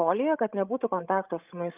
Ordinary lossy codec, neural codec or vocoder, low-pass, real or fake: Opus, 24 kbps; codec, 16 kHz, 16 kbps, FreqCodec, larger model; 3.6 kHz; fake